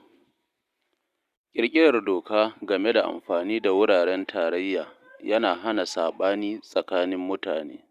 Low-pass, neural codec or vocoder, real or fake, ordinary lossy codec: 14.4 kHz; none; real; Opus, 64 kbps